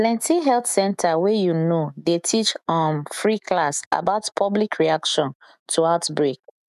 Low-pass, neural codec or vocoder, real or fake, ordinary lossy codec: 14.4 kHz; autoencoder, 48 kHz, 128 numbers a frame, DAC-VAE, trained on Japanese speech; fake; none